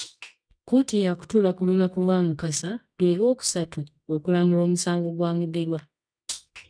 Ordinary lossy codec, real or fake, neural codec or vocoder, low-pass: none; fake; codec, 24 kHz, 0.9 kbps, WavTokenizer, medium music audio release; 9.9 kHz